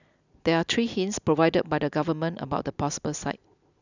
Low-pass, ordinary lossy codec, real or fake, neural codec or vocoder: 7.2 kHz; none; real; none